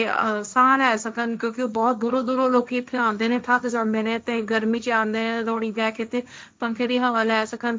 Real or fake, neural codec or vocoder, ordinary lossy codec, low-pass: fake; codec, 16 kHz, 1.1 kbps, Voila-Tokenizer; none; none